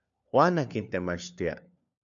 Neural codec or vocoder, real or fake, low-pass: codec, 16 kHz, 4 kbps, FunCodec, trained on LibriTTS, 50 frames a second; fake; 7.2 kHz